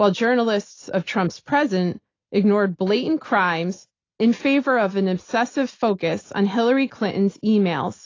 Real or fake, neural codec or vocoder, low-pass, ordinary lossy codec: real; none; 7.2 kHz; AAC, 32 kbps